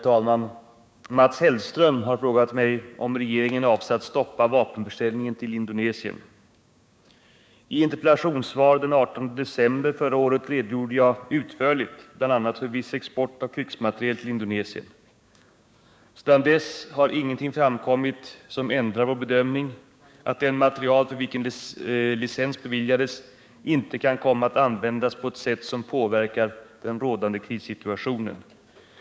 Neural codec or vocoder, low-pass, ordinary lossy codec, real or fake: codec, 16 kHz, 6 kbps, DAC; none; none; fake